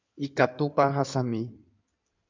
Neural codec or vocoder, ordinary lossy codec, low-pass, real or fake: codec, 16 kHz in and 24 kHz out, 2.2 kbps, FireRedTTS-2 codec; MP3, 64 kbps; 7.2 kHz; fake